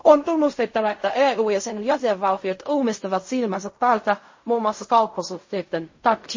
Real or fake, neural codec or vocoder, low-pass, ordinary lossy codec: fake; codec, 16 kHz in and 24 kHz out, 0.4 kbps, LongCat-Audio-Codec, fine tuned four codebook decoder; 7.2 kHz; MP3, 32 kbps